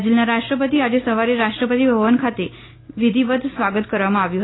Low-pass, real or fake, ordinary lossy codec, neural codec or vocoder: 7.2 kHz; real; AAC, 16 kbps; none